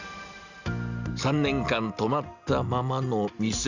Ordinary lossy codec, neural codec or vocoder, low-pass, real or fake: Opus, 64 kbps; none; 7.2 kHz; real